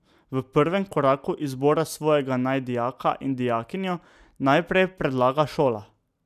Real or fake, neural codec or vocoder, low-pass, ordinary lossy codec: real; none; 14.4 kHz; none